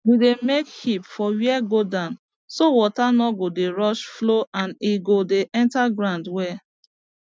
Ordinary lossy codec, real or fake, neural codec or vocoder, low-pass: none; real; none; none